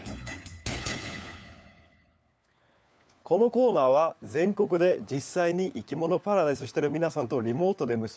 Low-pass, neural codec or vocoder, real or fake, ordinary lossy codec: none; codec, 16 kHz, 4 kbps, FunCodec, trained on LibriTTS, 50 frames a second; fake; none